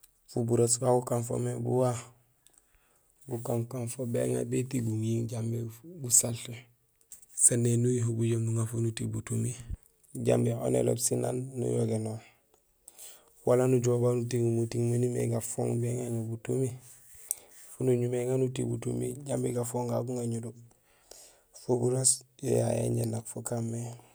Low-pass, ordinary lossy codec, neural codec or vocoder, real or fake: none; none; none; real